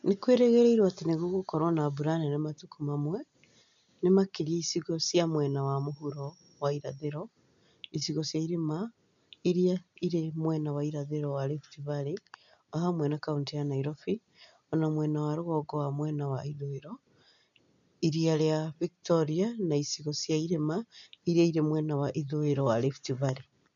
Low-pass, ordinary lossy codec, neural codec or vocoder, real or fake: 7.2 kHz; none; none; real